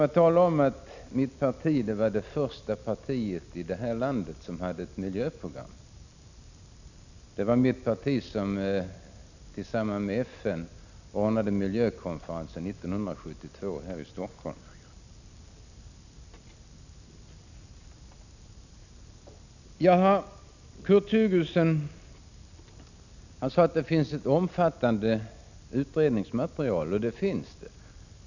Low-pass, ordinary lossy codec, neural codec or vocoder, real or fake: 7.2 kHz; none; none; real